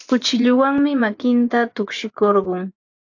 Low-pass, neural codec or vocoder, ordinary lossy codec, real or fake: 7.2 kHz; vocoder, 24 kHz, 100 mel bands, Vocos; AAC, 48 kbps; fake